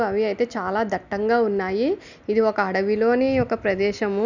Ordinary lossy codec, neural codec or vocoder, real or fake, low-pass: none; none; real; 7.2 kHz